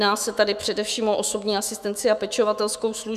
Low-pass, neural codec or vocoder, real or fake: 14.4 kHz; autoencoder, 48 kHz, 128 numbers a frame, DAC-VAE, trained on Japanese speech; fake